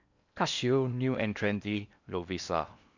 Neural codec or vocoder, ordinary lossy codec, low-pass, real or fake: codec, 16 kHz in and 24 kHz out, 0.8 kbps, FocalCodec, streaming, 65536 codes; none; 7.2 kHz; fake